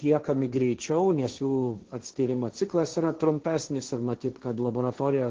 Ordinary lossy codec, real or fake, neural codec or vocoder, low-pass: Opus, 16 kbps; fake; codec, 16 kHz, 1.1 kbps, Voila-Tokenizer; 7.2 kHz